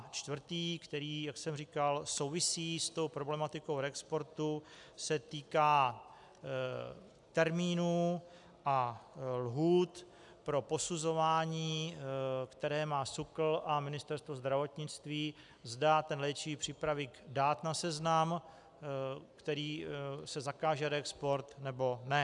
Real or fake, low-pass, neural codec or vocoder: real; 10.8 kHz; none